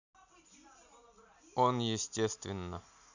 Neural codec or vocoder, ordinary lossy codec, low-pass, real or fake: none; none; 7.2 kHz; real